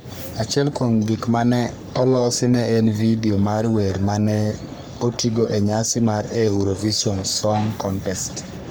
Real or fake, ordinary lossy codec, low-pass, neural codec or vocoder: fake; none; none; codec, 44.1 kHz, 3.4 kbps, Pupu-Codec